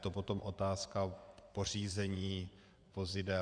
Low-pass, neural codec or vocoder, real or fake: 9.9 kHz; vocoder, 24 kHz, 100 mel bands, Vocos; fake